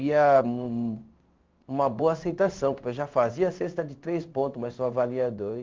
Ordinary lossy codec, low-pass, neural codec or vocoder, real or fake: Opus, 32 kbps; 7.2 kHz; codec, 16 kHz in and 24 kHz out, 1 kbps, XY-Tokenizer; fake